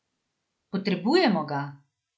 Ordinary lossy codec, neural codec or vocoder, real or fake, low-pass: none; none; real; none